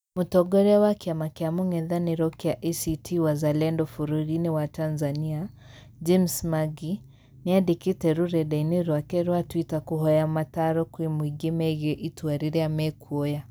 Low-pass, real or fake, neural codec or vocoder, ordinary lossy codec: none; real; none; none